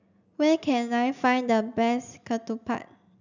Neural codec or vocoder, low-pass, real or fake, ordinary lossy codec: none; 7.2 kHz; real; none